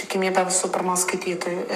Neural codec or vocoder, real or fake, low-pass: codec, 44.1 kHz, 7.8 kbps, Pupu-Codec; fake; 14.4 kHz